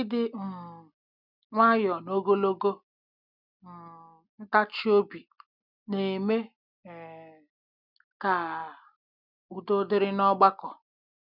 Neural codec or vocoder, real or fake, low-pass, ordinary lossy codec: none; real; 5.4 kHz; none